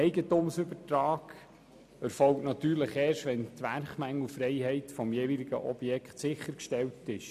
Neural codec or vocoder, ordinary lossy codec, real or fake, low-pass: none; none; real; 14.4 kHz